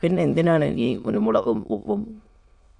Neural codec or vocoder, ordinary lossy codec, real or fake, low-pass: autoencoder, 22.05 kHz, a latent of 192 numbers a frame, VITS, trained on many speakers; Opus, 64 kbps; fake; 9.9 kHz